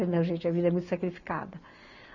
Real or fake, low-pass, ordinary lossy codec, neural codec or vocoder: real; 7.2 kHz; none; none